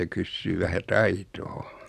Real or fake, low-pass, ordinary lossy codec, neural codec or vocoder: real; 14.4 kHz; none; none